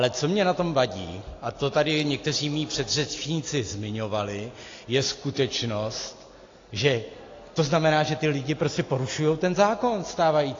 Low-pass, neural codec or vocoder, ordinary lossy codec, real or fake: 7.2 kHz; none; AAC, 32 kbps; real